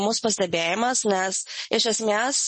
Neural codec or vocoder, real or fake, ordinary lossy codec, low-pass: none; real; MP3, 32 kbps; 10.8 kHz